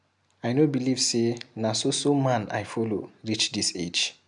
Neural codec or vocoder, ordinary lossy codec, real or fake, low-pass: none; MP3, 96 kbps; real; 10.8 kHz